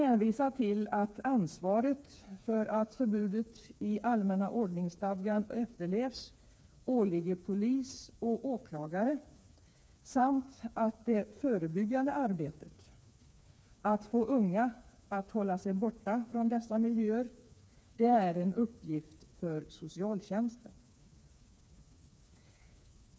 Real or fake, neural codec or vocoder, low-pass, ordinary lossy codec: fake; codec, 16 kHz, 4 kbps, FreqCodec, smaller model; none; none